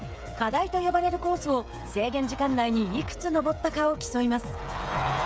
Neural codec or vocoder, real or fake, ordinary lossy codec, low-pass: codec, 16 kHz, 8 kbps, FreqCodec, smaller model; fake; none; none